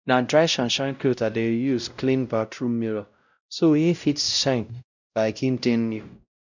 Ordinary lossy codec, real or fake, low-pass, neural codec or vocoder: none; fake; 7.2 kHz; codec, 16 kHz, 0.5 kbps, X-Codec, WavLM features, trained on Multilingual LibriSpeech